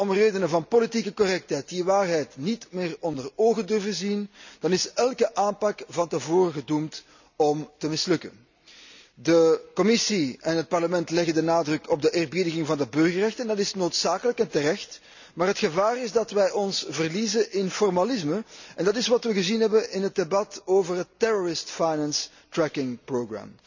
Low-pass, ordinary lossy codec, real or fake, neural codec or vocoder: 7.2 kHz; none; real; none